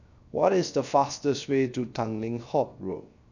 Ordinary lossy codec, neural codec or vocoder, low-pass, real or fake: none; codec, 16 kHz, 0.3 kbps, FocalCodec; 7.2 kHz; fake